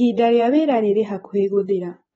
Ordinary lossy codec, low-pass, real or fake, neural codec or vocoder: AAC, 24 kbps; 10.8 kHz; fake; vocoder, 24 kHz, 100 mel bands, Vocos